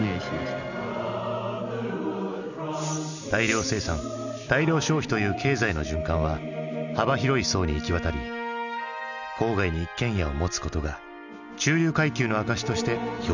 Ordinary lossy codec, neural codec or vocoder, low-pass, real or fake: none; none; 7.2 kHz; real